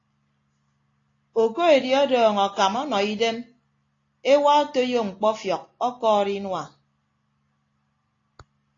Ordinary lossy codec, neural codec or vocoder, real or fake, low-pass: AAC, 32 kbps; none; real; 7.2 kHz